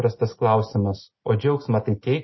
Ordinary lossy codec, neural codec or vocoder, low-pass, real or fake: MP3, 24 kbps; none; 7.2 kHz; real